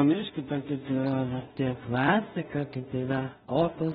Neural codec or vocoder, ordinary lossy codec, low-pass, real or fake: codec, 16 kHz in and 24 kHz out, 0.4 kbps, LongCat-Audio-Codec, two codebook decoder; AAC, 16 kbps; 10.8 kHz; fake